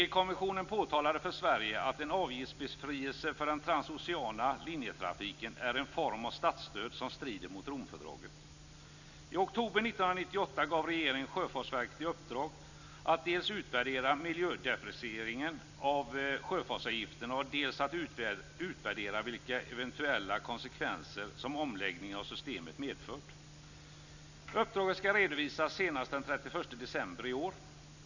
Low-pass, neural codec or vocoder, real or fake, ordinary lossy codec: 7.2 kHz; none; real; none